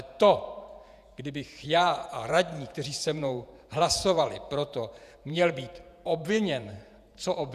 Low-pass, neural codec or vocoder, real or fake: 14.4 kHz; vocoder, 48 kHz, 128 mel bands, Vocos; fake